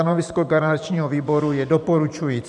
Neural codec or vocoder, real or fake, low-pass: vocoder, 44.1 kHz, 128 mel bands every 512 samples, BigVGAN v2; fake; 10.8 kHz